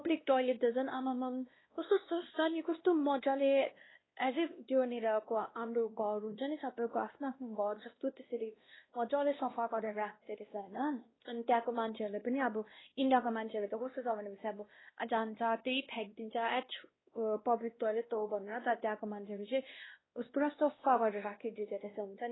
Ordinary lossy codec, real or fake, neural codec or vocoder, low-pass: AAC, 16 kbps; fake; codec, 16 kHz, 1 kbps, X-Codec, WavLM features, trained on Multilingual LibriSpeech; 7.2 kHz